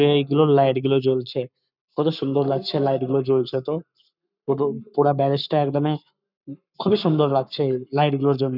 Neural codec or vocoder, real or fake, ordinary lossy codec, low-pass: codec, 44.1 kHz, 7.8 kbps, Pupu-Codec; fake; none; 5.4 kHz